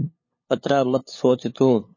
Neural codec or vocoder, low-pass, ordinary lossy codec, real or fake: codec, 16 kHz, 2 kbps, FunCodec, trained on LibriTTS, 25 frames a second; 7.2 kHz; MP3, 32 kbps; fake